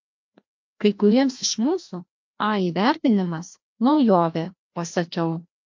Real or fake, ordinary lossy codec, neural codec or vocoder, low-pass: fake; MP3, 48 kbps; codec, 16 kHz, 1 kbps, FreqCodec, larger model; 7.2 kHz